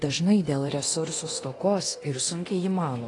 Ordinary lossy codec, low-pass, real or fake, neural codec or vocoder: AAC, 32 kbps; 10.8 kHz; fake; codec, 24 kHz, 0.9 kbps, DualCodec